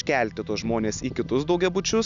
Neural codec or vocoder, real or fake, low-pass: none; real; 7.2 kHz